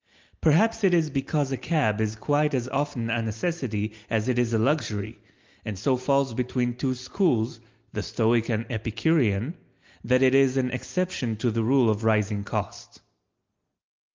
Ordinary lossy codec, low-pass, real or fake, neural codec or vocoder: Opus, 32 kbps; 7.2 kHz; real; none